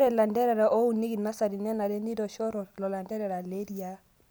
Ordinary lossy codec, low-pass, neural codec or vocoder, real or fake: none; none; none; real